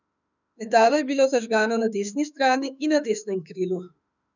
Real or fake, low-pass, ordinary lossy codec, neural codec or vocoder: fake; 7.2 kHz; none; autoencoder, 48 kHz, 32 numbers a frame, DAC-VAE, trained on Japanese speech